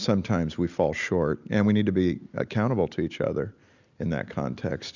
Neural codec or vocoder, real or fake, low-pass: none; real; 7.2 kHz